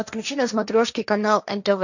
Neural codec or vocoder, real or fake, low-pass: codec, 16 kHz, 1.1 kbps, Voila-Tokenizer; fake; 7.2 kHz